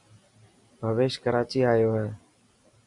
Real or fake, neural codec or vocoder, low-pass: real; none; 10.8 kHz